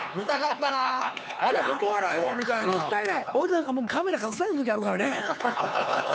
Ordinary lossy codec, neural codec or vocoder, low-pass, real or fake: none; codec, 16 kHz, 4 kbps, X-Codec, HuBERT features, trained on LibriSpeech; none; fake